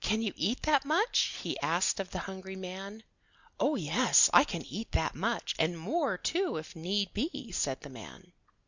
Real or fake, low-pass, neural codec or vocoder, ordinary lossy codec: real; 7.2 kHz; none; Opus, 64 kbps